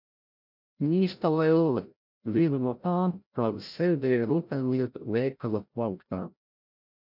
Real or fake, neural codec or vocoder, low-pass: fake; codec, 16 kHz, 0.5 kbps, FreqCodec, larger model; 5.4 kHz